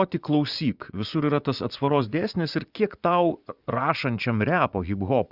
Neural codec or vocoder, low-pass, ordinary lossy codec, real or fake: vocoder, 24 kHz, 100 mel bands, Vocos; 5.4 kHz; Opus, 64 kbps; fake